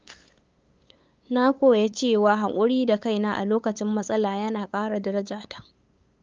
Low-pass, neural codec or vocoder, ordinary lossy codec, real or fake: 7.2 kHz; codec, 16 kHz, 8 kbps, FunCodec, trained on LibriTTS, 25 frames a second; Opus, 24 kbps; fake